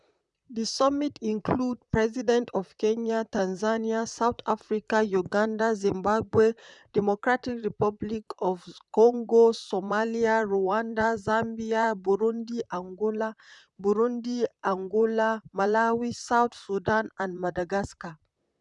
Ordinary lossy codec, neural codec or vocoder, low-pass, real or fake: none; vocoder, 44.1 kHz, 128 mel bands, Pupu-Vocoder; 10.8 kHz; fake